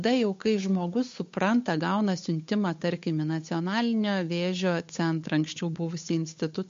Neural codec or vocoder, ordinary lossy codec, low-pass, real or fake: codec, 16 kHz, 8 kbps, FunCodec, trained on Chinese and English, 25 frames a second; MP3, 48 kbps; 7.2 kHz; fake